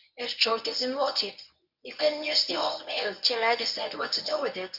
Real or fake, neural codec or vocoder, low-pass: fake; codec, 24 kHz, 0.9 kbps, WavTokenizer, medium speech release version 1; 5.4 kHz